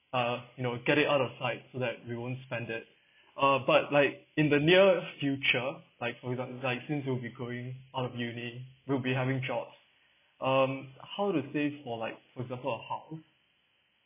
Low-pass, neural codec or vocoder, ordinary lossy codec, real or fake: 3.6 kHz; none; none; real